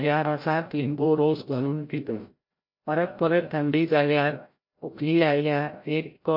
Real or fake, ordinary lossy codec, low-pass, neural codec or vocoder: fake; MP3, 32 kbps; 5.4 kHz; codec, 16 kHz, 0.5 kbps, FreqCodec, larger model